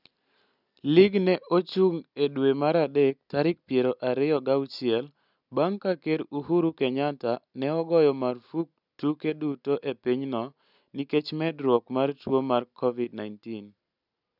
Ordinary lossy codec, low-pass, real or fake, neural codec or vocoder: none; 5.4 kHz; real; none